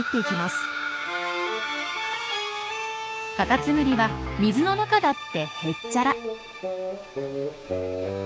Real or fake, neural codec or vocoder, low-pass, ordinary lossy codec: fake; codec, 16 kHz, 6 kbps, DAC; none; none